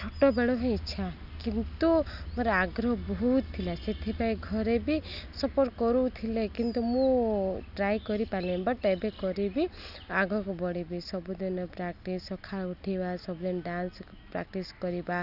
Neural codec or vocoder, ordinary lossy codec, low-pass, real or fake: none; none; 5.4 kHz; real